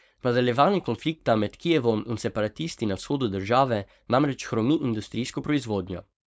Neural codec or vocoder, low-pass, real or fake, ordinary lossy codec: codec, 16 kHz, 4.8 kbps, FACodec; none; fake; none